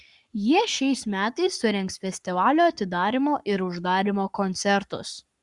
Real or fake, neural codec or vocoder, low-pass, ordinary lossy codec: fake; codec, 44.1 kHz, 7.8 kbps, Pupu-Codec; 10.8 kHz; Opus, 64 kbps